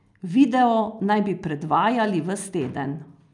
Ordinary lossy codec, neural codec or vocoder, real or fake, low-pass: none; none; real; 10.8 kHz